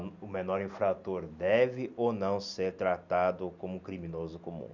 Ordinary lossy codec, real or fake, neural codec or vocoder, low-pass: none; real; none; 7.2 kHz